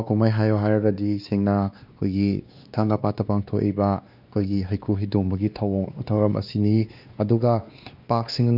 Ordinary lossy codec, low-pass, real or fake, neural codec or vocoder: none; 5.4 kHz; fake; codec, 16 kHz, 2 kbps, X-Codec, WavLM features, trained on Multilingual LibriSpeech